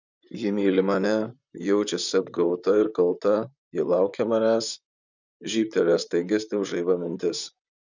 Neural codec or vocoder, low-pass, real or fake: vocoder, 44.1 kHz, 128 mel bands, Pupu-Vocoder; 7.2 kHz; fake